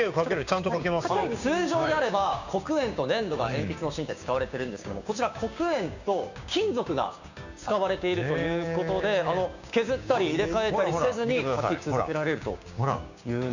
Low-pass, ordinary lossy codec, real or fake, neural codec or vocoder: 7.2 kHz; none; fake; codec, 16 kHz, 6 kbps, DAC